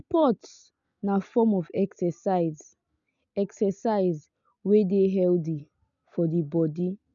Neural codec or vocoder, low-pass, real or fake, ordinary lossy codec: none; 7.2 kHz; real; none